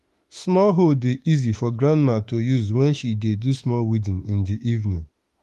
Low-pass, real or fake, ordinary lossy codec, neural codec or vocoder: 14.4 kHz; fake; Opus, 24 kbps; autoencoder, 48 kHz, 32 numbers a frame, DAC-VAE, trained on Japanese speech